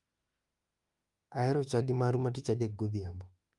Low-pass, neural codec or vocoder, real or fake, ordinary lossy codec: 10.8 kHz; autoencoder, 48 kHz, 32 numbers a frame, DAC-VAE, trained on Japanese speech; fake; Opus, 32 kbps